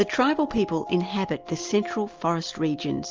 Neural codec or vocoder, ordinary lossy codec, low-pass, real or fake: none; Opus, 24 kbps; 7.2 kHz; real